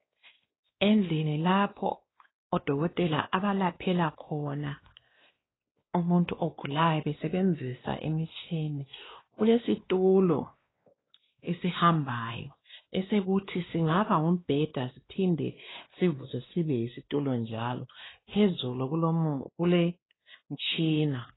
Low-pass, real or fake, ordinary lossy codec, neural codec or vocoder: 7.2 kHz; fake; AAC, 16 kbps; codec, 16 kHz, 2 kbps, X-Codec, WavLM features, trained on Multilingual LibriSpeech